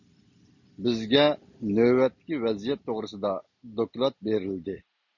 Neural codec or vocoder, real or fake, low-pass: none; real; 7.2 kHz